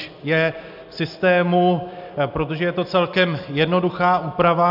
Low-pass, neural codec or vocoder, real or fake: 5.4 kHz; none; real